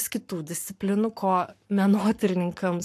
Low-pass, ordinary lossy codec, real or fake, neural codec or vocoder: 14.4 kHz; AAC, 64 kbps; fake; codec, 44.1 kHz, 7.8 kbps, Pupu-Codec